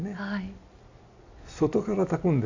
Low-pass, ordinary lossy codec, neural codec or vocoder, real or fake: 7.2 kHz; AAC, 48 kbps; none; real